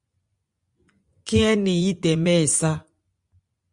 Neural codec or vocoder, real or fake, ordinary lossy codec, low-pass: vocoder, 24 kHz, 100 mel bands, Vocos; fake; Opus, 64 kbps; 10.8 kHz